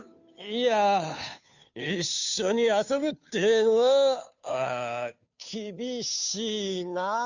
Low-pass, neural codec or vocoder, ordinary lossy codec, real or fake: 7.2 kHz; codec, 16 kHz, 2 kbps, FunCodec, trained on Chinese and English, 25 frames a second; none; fake